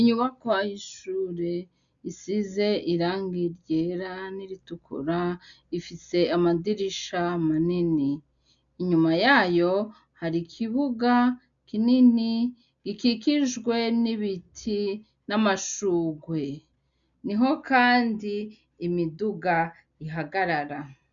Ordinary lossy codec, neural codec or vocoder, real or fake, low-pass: MP3, 96 kbps; none; real; 7.2 kHz